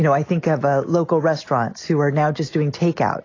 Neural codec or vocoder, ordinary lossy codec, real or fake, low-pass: none; AAC, 32 kbps; real; 7.2 kHz